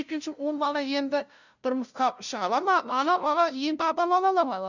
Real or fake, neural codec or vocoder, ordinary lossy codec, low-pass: fake; codec, 16 kHz, 0.5 kbps, FunCodec, trained on LibriTTS, 25 frames a second; none; 7.2 kHz